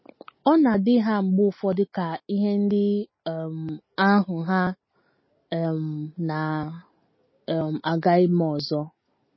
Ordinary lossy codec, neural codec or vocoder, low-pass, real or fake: MP3, 24 kbps; none; 7.2 kHz; real